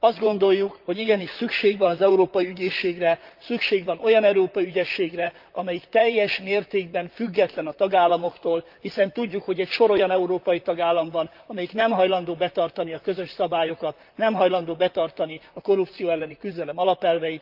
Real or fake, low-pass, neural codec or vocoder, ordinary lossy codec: fake; 5.4 kHz; vocoder, 44.1 kHz, 128 mel bands, Pupu-Vocoder; Opus, 24 kbps